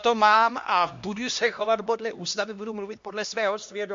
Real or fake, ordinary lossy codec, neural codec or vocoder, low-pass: fake; MP3, 48 kbps; codec, 16 kHz, 1 kbps, X-Codec, HuBERT features, trained on LibriSpeech; 7.2 kHz